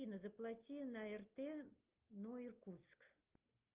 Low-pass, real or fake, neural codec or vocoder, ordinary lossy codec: 3.6 kHz; real; none; Opus, 24 kbps